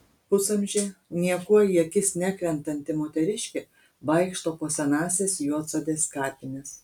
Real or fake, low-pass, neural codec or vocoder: real; 19.8 kHz; none